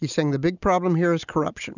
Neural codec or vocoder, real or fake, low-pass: none; real; 7.2 kHz